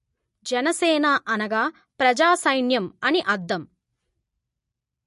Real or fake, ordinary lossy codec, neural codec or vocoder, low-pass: real; MP3, 48 kbps; none; 14.4 kHz